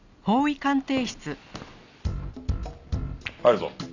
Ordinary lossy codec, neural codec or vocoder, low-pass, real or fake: none; none; 7.2 kHz; real